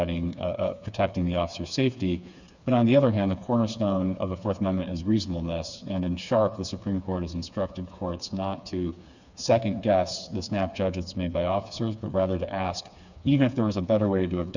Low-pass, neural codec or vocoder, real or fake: 7.2 kHz; codec, 16 kHz, 4 kbps, FreqCodec, smaller model; fake